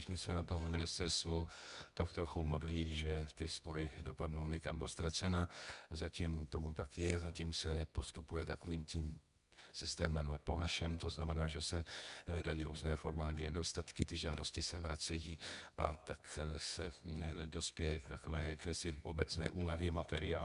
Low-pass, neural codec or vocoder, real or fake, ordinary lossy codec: 10.8 kHz; codec, 24 kHz, 0.9 kbps, WavTokenizer, medium music audio release; fake; MP3, 96 kbps